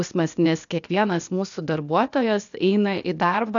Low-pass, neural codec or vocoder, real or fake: 7.2 kHz; codec, 16 kHz, 0.8 kbps, ZipCodec; fake